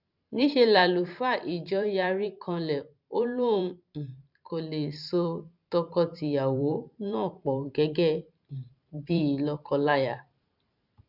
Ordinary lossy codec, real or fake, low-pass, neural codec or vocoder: none; fake; 5.4 kHz; vocoder, 44.1 kHz, 128 mel bands every 512 samples, BigVGAN v2